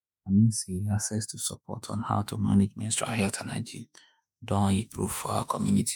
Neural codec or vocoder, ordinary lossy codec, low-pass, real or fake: autoencoder, 48 kHz, 32 numbers a frame, DAC-VAE, trained on Japanese speech; none; none; fake